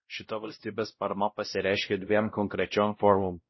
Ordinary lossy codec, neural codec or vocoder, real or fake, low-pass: MP3, 24 kbps; codec, 16 kHz, 0.5 kbps, X-Codec, WavLM features, trained on Multilingual LibriSpeech; fake; 7.2 kHz